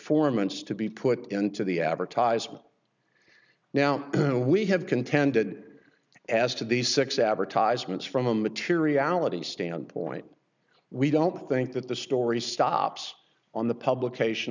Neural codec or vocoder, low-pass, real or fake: none; 7.2 kHz; real